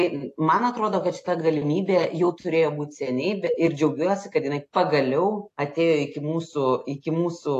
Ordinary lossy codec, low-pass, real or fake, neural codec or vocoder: AAC, 48 kbps; 14.4 kHz; real; none